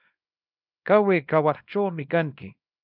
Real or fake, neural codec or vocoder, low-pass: fake; codec, 24 kHz, 0.9 kbps, WavTokenizer, small release; 5.4 kHz